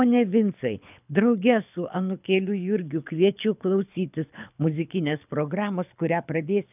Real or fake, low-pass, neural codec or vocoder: fake; 3.6 kHz; codec, 24 kHz, 6 kbps, HILCodec